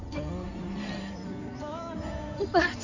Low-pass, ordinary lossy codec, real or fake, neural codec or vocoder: 7.2 kHz; none; fake; codec, 16 kHz in and 24 kHz out, 2.2 kbps, FireRedTTS-2 codec